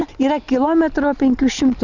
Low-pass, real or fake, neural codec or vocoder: 7.2 kHz; real; none